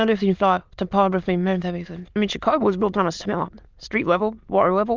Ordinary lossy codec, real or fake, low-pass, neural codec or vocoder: Opus, 24 kbps; fake; 7.2 kHz; autoencoder, 22.05 kHz, a latent of 192 numbers a frame, VITS, trained on many speakers